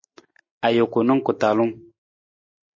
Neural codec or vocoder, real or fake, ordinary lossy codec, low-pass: none; real; MP3, 32 kbps; 7.2 kHz